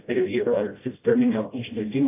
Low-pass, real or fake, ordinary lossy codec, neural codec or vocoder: 3.6 kHz; fake; AAC, 16 kbps; codec, 16 kHz, 0.5 kbps, FreqCodec, smaller model